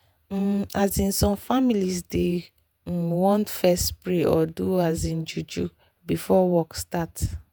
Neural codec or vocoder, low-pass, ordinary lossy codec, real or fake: vocoder, 48 kHz, 128 mel bands, Vocos; none; none; fake